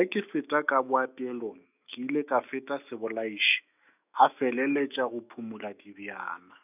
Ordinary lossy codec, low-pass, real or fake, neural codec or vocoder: none; 3.6 kHz; fake; autoencoder, 48 kHz, 128 numbers a frame, DAC-VAE, trained on Japanese speech